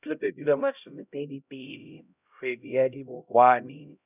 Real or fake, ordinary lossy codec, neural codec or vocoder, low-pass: fake; none; codec, 16 kHz, 0.5 kbps, X-Codec, HuBERT features, trained on LibriSpeech; 3.6 kHz